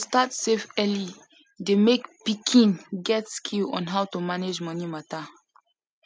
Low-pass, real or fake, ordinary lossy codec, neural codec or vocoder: none; real; none; none